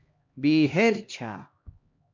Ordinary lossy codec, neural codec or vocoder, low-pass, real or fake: MP3, 48 kbps; codec, 16 kHz, 1 kbps, X-Codec, HuBERT features, trained on LibriSpeech; 7.2 kHz; fake